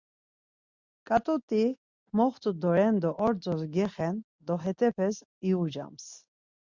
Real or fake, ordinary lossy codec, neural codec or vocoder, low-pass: real; Opus, 64 kbps; none; 7.2 kHz